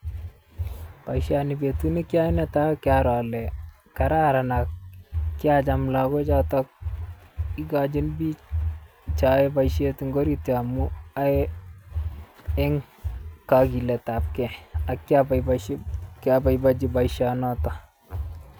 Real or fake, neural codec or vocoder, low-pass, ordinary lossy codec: real; none; none; none